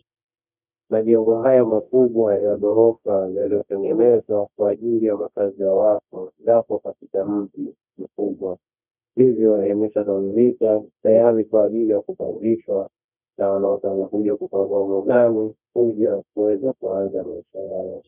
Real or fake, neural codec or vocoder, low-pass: fake; codec, 24 kHz, 0.9 kbps, WavTokenizer, medium music audio release; 3.6 kHz